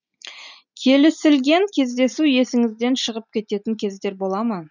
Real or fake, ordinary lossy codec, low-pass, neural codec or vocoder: real; none; 7.2 kHz; none